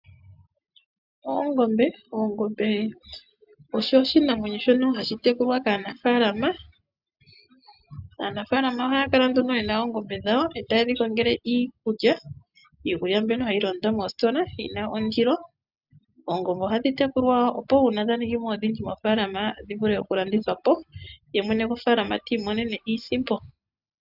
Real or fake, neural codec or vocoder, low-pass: real; none; 5.4 kHz